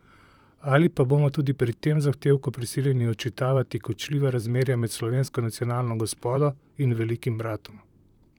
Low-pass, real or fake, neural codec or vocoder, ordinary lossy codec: 19.8 kHz; fake; vocoder, 44.1 kHz, 128 mel bands, Pupu-Vocoder; none